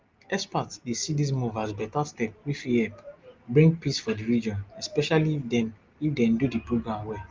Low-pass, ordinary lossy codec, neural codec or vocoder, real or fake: 7.2 kHz; Opus, 24 kbps; none; real